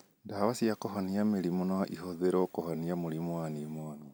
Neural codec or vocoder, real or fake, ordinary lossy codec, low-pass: none; real; none; none